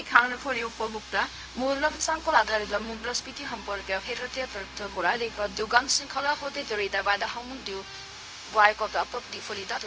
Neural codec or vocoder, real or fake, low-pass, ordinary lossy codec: codec, 16 kHz, 0.4 kbps, LongCat-Audio-Codec; fake; none; none